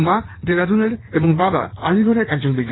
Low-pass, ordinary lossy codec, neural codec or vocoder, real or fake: 7.2 kHz; AAC, 16 kbps; codec, 16 kHz in and 24 kHz out, 1.1 kbps, FireRedTTS-2 codec; fake